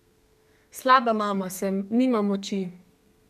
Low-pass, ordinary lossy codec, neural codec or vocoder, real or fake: 14.4 kHz; none; codec, 32 kHz, 1.9 kbps, SNAC; fake